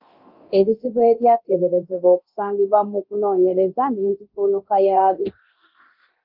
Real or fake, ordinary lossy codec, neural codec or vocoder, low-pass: fake; Opus, 32 kbps; codec, 24 kHz, 0.9 kbps, DualCodec; 5.4 kHz